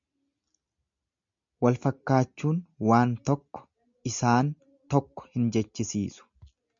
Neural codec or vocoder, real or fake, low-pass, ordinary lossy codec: none; real; 7.2 kHz; MP3, 64 kbps